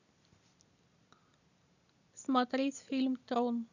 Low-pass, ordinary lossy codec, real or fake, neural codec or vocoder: 7.2 kHz; none; real; none